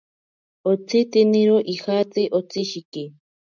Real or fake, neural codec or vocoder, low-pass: real; none; 7.2 kHz